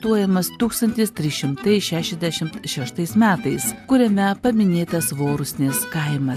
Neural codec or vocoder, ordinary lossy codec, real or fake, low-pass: none; AAC, 96 kbps; real; 14.4 kHz